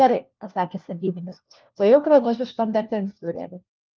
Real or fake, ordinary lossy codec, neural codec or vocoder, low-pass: fake; Opus, 32 kbps; codec, 16 kHz, 1 kbps, FunCodec, trained on LibriTTS, 50 frames a second; 7.2 kHz